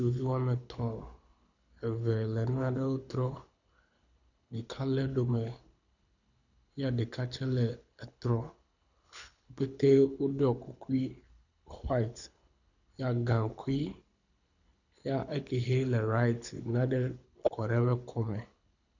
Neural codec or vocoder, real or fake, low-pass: codec, 24 kHz, 6 kbps, HILCodec; fake; 7.2 kHz